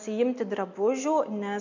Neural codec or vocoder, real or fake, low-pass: none; real; 7.2 kHz